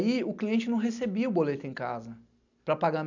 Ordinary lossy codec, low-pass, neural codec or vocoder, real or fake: none; 7.2 kHz; none; real